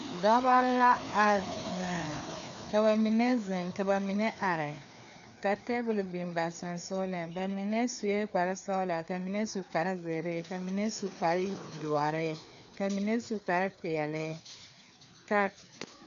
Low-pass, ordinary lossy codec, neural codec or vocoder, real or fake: 7.2 kHz; MP3, 64 kbps; codec, 16 kHz, 2 kbps, FreqCodec, larger model; fake